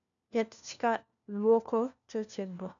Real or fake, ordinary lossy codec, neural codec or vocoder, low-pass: fake; none; codec, 16 kHz, 1 kbps, FunCodec, trained on LibriTTS, 50 frames a second; 7.2 kHz